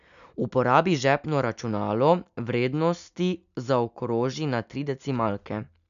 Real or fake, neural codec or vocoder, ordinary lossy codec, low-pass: real; none; none; 7.2 kHz